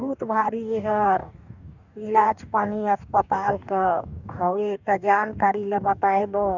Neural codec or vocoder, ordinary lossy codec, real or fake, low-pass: codec, 44.1 kHz, 2.6 kbps, DAC; none; fake; 7.2 kHz